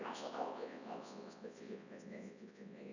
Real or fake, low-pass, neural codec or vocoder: fake; 7.2 kHz; codec, 24 kHz, 0.9 kbps, WavTokenizer, large speech release